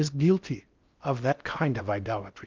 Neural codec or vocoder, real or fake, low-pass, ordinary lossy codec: codec, 16 kHz in and 24 kHz out, 0.6 kbps, FocalCodec, streaming, 2048 codes; fake; 7.2 kHz; Opus, 24 kbps